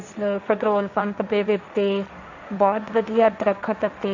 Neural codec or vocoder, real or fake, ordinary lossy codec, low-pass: codec, 16 kHz, 1.1 kbps, Voila-Tokenizer; fake; none; 7.2 kHz